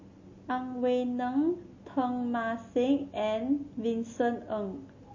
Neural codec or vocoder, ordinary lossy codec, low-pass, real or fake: none; MP3, 32 kbps; 7.2 kHz; real